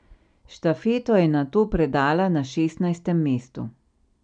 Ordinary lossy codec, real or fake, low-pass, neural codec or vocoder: none; real; 9.9 kHz; none